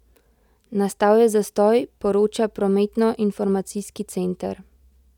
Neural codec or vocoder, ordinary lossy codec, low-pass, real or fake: none; none; 19.8 kHz; real